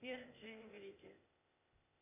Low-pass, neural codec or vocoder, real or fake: 3.6 kHz; codec, 16 kHz, 0.8 kbps, ZipCodec; fake